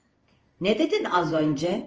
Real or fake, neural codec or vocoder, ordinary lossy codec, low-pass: fake; codec, 16 kHz in and 24 kHz out, 1 kbps, XY-Tokenizer; Opus, 24 kbps; 7.2 kHz